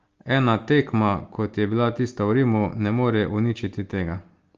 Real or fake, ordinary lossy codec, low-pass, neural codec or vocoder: real; Opus, 32 kbps; 7.2 kHz; none